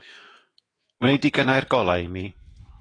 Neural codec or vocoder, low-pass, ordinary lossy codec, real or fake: autoencoder, 48 kHz, 128 numbers a frame, DAC-VAE, trained on Japanese speech; 9.9 kHz; AAC, 32 kbps; fake